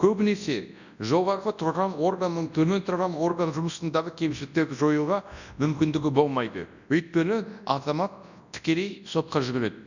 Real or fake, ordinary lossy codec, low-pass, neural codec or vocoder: fake; none; 7.2 kHz; codec, 24 kHz, 0.9 kbps, WavTokenizer, large speech release